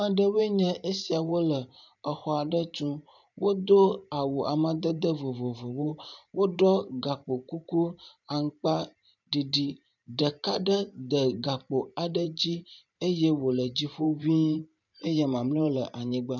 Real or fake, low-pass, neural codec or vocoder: fake; 7.2 kHz; vocoder, 44.1 kHz, 128 mel bands every 512 samples, BigVGAN v2